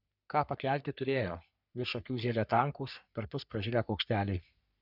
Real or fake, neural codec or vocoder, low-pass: fake; codec, 44.1 kHz, 3.4 kbps, Pupu-Codec; 5.4 kHz